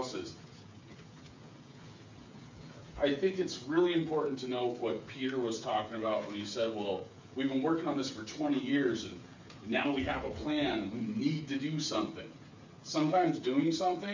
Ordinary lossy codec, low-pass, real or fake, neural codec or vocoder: MP3, 64 kbps; 7.2 kHz; fake; codec, 16 kHz, 8 kbps, FreqCodec, smaller model